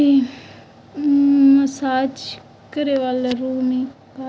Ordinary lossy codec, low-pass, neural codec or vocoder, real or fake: none; none; none; real